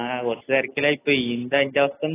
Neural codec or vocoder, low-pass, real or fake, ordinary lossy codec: none; 3.6 kHz; real; AAC, 16 kbps